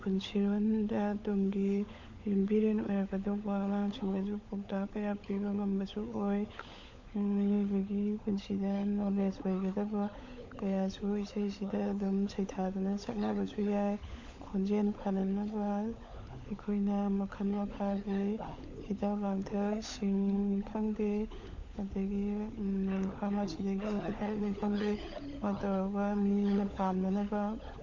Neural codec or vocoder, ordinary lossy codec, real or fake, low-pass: codec, 16 kHz, 16 kbps, FunCodec, trained on LibriTTS, 50 frames a second; MP3, 48 kbps; fake; 7.2 kHz